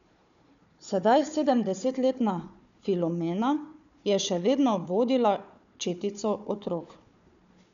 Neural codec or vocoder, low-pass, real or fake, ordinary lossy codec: codec, 16 kHz, 4 kbps, FunCodec, trained on Chinese and English, 50 frames a second; 7.2 kHz; fake; none